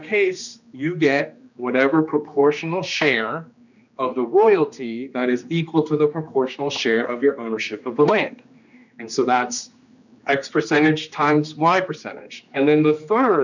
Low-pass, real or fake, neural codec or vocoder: 7.2 kHz; fake; codec, 16 kHz, 2 kbps, X-Codec, HuBERT features, trained on general audio